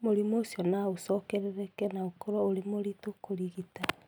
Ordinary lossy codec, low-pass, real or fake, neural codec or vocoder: none; none; real; none